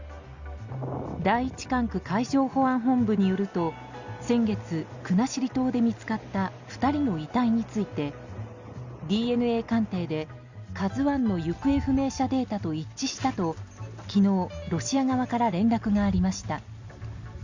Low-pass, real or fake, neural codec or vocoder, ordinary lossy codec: 7.2 kHz; real; none; Opus, 64 kbps